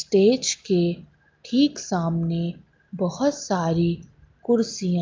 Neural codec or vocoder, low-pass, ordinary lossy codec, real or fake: none; 7.2 kHz; Opus, 24 kbps; real